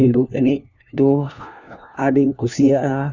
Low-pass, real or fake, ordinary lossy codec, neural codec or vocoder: 7.2 kHz; fake; none; codec, 16 kHz, 1 kbps, FunCodec, trained on LibriTTS, 50 frames a second